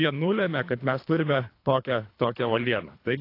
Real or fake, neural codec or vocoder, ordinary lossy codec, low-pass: fake; codec, 24 kHz, 3 kbps, HILCodec; AAC, 32 kbps; 5.4 kHz